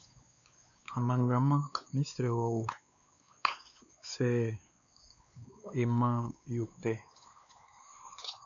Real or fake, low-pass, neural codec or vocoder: fake; 7.2 kHz; codec, 16 kHz, 2 kbps, X-Codec, WavLM features, trained on Multilingual LibriSpeech